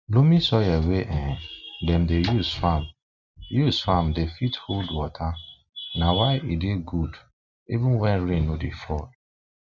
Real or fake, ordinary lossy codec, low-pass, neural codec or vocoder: real; none; 7.2 kHz; none